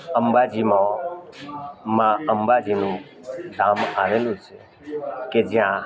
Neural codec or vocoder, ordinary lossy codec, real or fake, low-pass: none; none; real; none